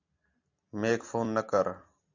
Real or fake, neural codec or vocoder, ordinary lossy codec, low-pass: real; none; AAC, 48 kbps; 7.2 kHz